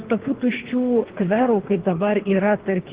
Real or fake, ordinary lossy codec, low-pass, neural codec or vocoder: fake; Opus, 16 kbps; 3.6 kHz; codec, 24 kHz, 3 kbps, HILCodec